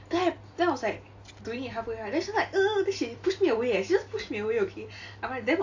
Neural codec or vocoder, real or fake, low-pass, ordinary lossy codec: none; real; 7.2 kHz; AAC, 48 kbps